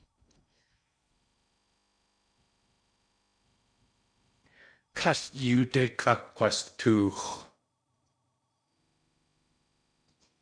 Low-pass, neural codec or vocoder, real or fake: 9.9 kHz; codec, 16 kHz in and 24 kHz out, 0.6 kbps, FocalCodec, streaming, 4096 codes; fake